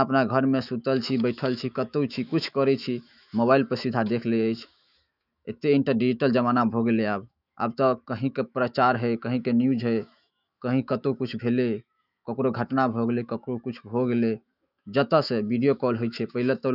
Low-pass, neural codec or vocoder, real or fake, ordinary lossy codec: 5.4 kHz; autoencoder, 48 kHz, 128 numbers a frame, DAC-VAE, trained on Japanese speech; fake; none